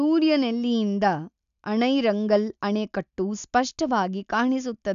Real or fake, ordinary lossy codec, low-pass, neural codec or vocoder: real; none; 7.2 kHz; none